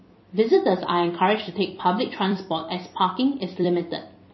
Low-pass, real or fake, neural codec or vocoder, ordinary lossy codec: 7.2 kHz; fake; vocoder, 44.1 kHz, 128 mel bands every 256 samples, BigVGAN v2; MP3, 24 kbps